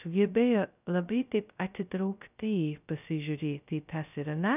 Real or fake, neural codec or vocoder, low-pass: fake; codec, 16 kHz, 0.2 kbps, FocalCodec; 3.6 kHz